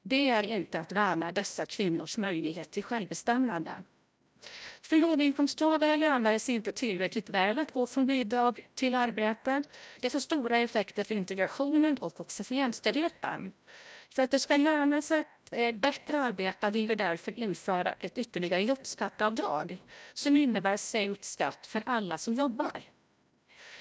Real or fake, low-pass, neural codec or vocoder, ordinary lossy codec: fake; none; codec, 16 kHz, 0.5 kbps, FreqCodec, larger model; none